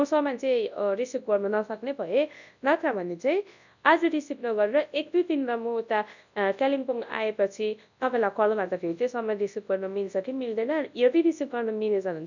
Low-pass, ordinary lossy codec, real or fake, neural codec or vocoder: 7.2 kHz; none; fake; codec, 24 kHz, 0.9 kbps, WavTokenizer, large speech release